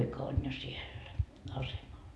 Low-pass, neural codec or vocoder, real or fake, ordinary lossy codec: none; none; real; none